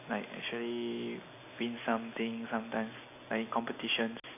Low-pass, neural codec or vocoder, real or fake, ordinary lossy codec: 3.6 kHz; none; real; none